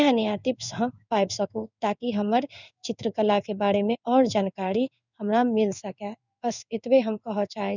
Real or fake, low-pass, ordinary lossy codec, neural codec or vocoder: fake; 7.2 kHz; none; codec, 16 kHz in and 24 kHz out, 1 kbps, XY-Tokenizer